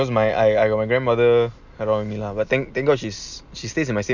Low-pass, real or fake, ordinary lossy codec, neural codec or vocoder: 7.2 kHz; real; none; none